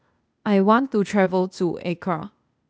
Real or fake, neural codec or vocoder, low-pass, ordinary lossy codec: fake; codec, 16 kHz, 0.8 kbps, ZipCodec; none; none